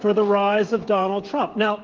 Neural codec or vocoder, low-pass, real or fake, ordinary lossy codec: codec, 16 kHz, 16 kbps, FreqCodec, smaller model; 7.2 kHz; fake; Opus, 16 kbps